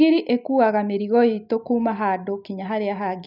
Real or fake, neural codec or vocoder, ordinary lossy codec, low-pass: real; none; none; 5.4 kHz